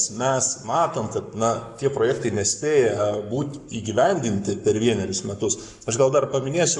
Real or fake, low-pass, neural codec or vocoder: fake; 10.8 kHz; codec, 44.1 kHz, 7.8 kbps, Pupu-Codec